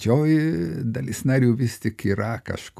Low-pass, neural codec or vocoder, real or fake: 14.4 kHz; none; real